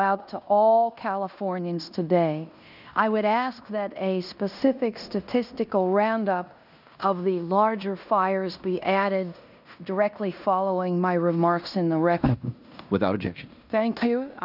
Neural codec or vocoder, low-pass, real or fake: codec, 16 kHz in and 24 kHz out, 0.9 kbps, LongCat-Audio-Codec, fine tuned four codebook decoder; 5.4 kHz; fake